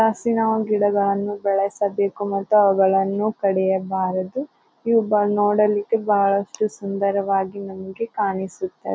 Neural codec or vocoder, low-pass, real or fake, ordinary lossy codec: none; none; real; none